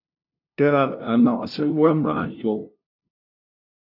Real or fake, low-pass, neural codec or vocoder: fake; 5.4 kHz; codec, 16 kHz, 0.5 kbps, FunCodec, trained on LibriTTS, 25 frames a second